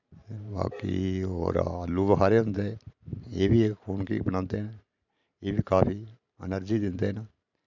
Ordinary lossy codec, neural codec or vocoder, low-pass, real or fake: none; vocoder, 44.1 kHz, 128 mel bands every 512 samples, BigVGAN v2; 7.2 kHz; fake